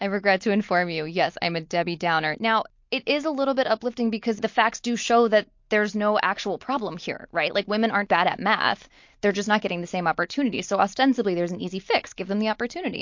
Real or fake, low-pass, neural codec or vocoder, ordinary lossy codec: real; 7.2 kHz; none; MP3, 48 kbps